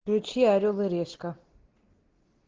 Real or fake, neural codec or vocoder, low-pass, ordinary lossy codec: real; none; 7.2 kHz; Opus, 16 kbps